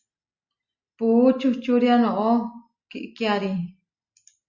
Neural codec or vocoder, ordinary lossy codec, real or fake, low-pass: none; Opus, 64 kbps; real; 7.2 kHz